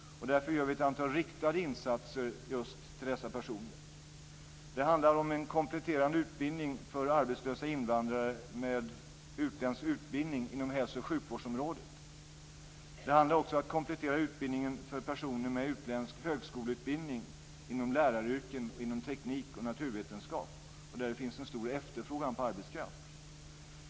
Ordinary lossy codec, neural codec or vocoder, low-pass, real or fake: none; none; none; real